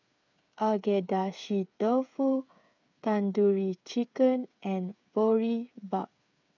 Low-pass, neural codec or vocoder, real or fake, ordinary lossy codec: 7.2 kHz; codec, 16 kHz, 8 kbps, FreqCodec, smaller model; fake; none